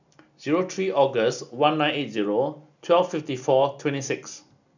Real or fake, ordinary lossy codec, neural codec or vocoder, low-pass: real; none; none; 7.2 kHz